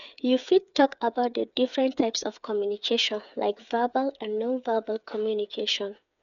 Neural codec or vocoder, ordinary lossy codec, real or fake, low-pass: codec, 16 kHz, 8 kbps, FreqCodec, smaller model; none; fake; 7.2 kHz